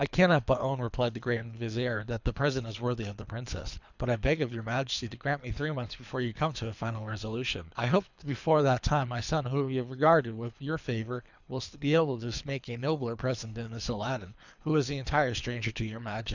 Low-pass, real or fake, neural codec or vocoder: 7.2 kHz; fake; codec, 24 kHz, 3 kbps, HILCodec